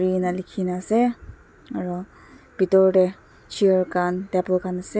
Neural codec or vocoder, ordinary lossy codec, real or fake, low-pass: none; none; real; none